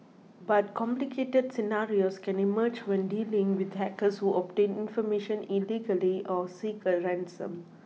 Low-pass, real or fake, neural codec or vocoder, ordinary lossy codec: none; real; none; none